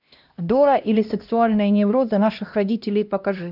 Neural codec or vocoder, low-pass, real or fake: codec, 16 kHz, 1 kbps, X-Codec, HuBERT features, trained on LibriSpeech; 5.4 kHz; fake